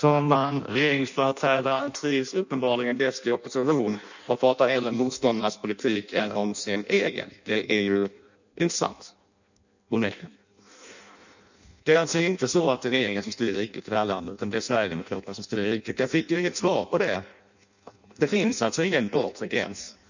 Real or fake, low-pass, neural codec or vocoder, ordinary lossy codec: fake; 7.2 kHz; codec, 16 kHz in and 24 kHz out, 0.6 kbps, FireRedTTS-2 codec; none